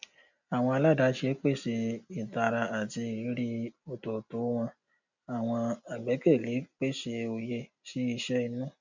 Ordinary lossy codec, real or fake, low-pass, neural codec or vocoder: none; real; 7.2 kHz; none